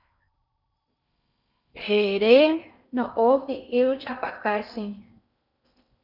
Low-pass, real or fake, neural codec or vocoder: 5.4 kHz; fake; codec, 16 kHz in and 24 kHz out, 0.6 kbps, FocalCodec, streaming, 4096 codes